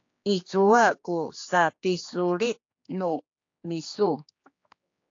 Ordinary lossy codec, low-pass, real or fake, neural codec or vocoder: AAC, 48 kbps; 7.2 kHz; fake; codec, 16 kHz, 2 kbps, X-Codec, HuBERT features, trained on general audio